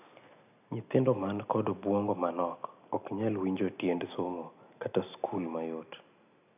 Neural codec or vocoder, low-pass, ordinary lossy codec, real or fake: none; 3.6 kHz; none; real